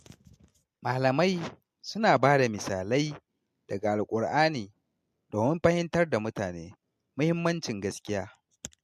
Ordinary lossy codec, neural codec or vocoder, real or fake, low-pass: MP3, 64 kbps; none; real; 14.4 kHz